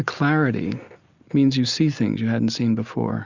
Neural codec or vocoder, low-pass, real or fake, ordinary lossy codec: none; 7.2 kHz; real; Opus, 64 kbps